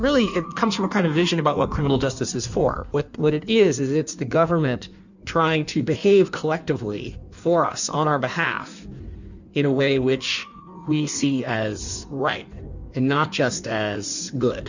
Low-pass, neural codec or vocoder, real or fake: 7.2 kHz; codec, 16 kHz in and 24 kHz out, 1.1 kbps, FireRedTTS-2 codec; fake